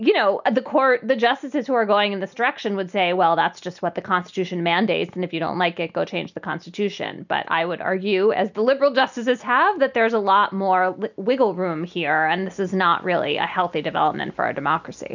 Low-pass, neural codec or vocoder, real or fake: 7.2 kHz; none; real